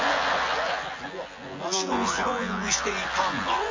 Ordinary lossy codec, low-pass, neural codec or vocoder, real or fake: MP3, 32 kbps; 7.2 kHz; vocoder, 24 kHz, 100 mel bands, Vocos; fake